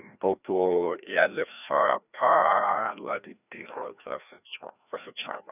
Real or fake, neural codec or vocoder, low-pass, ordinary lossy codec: fake; codec, 16 kHz, 1 kbps, FreqCodec, larger model; 3.6 kHz; none